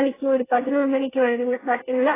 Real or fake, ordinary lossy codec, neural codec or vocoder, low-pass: fake; AAC, 16 kbps; codec, 24 kHz, 1 kbps, SNAC; 3.6 kHz